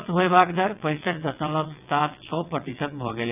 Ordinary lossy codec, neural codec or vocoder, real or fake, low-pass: none; vocoder, 22.05 kHz, 80 mel bands, WaveNeXt; fake; 3.6 kHz